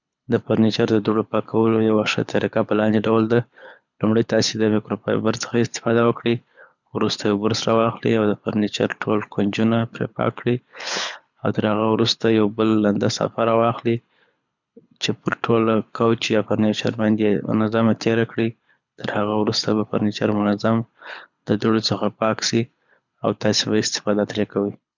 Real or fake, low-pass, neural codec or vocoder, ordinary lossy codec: fake; 7.2 kHz; codec, 24 kHz, 6 kbps, HILCodec; none